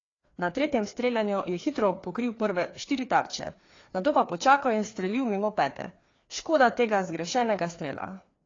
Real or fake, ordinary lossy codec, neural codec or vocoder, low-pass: fake; AAC, 32 kbps; codec, 16 kHz, 2 kbps, FreqCodec, larger model; 7.2 kHz